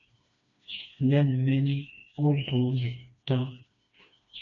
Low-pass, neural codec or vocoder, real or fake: 7.2 kHz; codec, 16 kHz, 2 kbps, FreqCodec, smaller model; fake